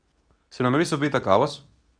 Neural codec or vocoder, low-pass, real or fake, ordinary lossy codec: codec, 24 kHz, 0.9 kbps, WavTokenizer, medium speech release version 2; 9.9 kHz; fake; none